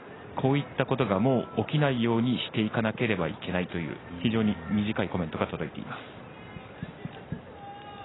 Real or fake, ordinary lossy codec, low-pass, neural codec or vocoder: real; AAC, 16 kbps; 7.2 kHz; none